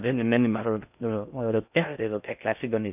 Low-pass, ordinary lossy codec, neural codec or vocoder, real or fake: 3.6 kHz; none; codec, 16 kHz in and 24 kHz out, 0.6 kbps, FocalCodec, streaming, 2048 codes; fake